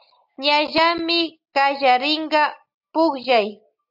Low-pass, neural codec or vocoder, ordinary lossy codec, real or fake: 5.4 kHz; none; AAC, 48 kbps; real